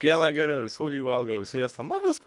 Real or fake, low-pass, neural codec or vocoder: fake; 10.8 kHz; codec, 24 kHz, 1.5 kbps, HILCodec